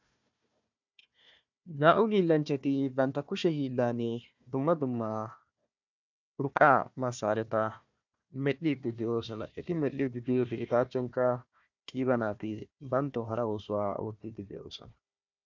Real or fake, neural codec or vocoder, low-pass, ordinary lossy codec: fake; codec, 16 kHz, 1 kbps, FunCodec, trained on Chinese and English, 50 frames a second; 7.2 kHz; MP3, 64 kbps